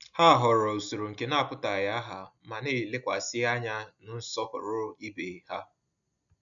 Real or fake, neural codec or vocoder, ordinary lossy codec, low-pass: real; none; none; 7.2 kHz